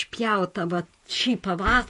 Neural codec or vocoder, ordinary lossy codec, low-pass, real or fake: vocoder, 48 kHz, 128 mel bands, Vocos; MP3, 48 kbps; 14.4 kHz; fake